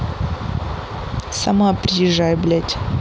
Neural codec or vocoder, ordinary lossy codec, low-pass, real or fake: none; none; none; real